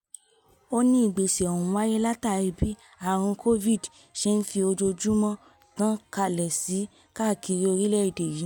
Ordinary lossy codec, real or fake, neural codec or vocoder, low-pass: none; real; none; 19.8 kHz